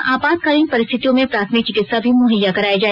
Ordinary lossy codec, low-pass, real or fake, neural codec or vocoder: none; 5.4 kHz; real; none